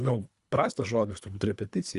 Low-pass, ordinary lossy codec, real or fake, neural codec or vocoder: 10.8 kHz; Opus, 64 kbps; fake; codec, 24 kHz, 3 kbps, HILCodec